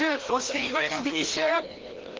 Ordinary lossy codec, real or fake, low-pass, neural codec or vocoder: Opus, 16 kbps; fake; 7.2 kHz; codec, 16 kHz, 1 kbps, FreqCodec, larger model